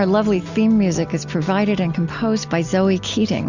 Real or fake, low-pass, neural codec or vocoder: real; 7.2 kHz; none